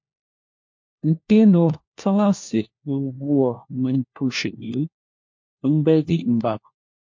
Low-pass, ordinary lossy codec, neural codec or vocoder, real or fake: 7.2 kHz; MP3, 48 kbps; codec, 16 kHz, 1 kbps, FunCodec, trained on LibriTTS, 50 frames a second; fake